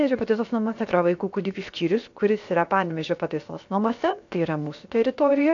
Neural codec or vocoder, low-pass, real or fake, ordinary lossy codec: codec, 16 kHz, 0.7 kbps, FocalCodec; 7.2 kHz; fake; AAC, 48 kbps